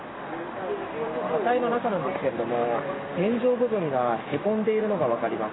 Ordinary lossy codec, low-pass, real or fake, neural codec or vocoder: AAC, 16 kbps; 7.2 kHz; real; none